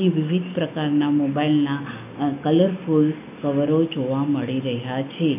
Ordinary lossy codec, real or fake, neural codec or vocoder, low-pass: none; real; none; 3.6 kHz